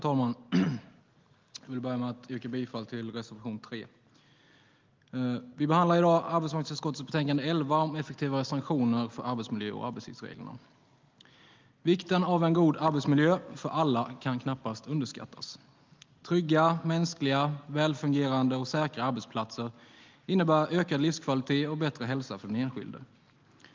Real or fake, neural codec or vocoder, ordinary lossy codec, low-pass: real; none; Opus, 32 kbps; 7.2 kHz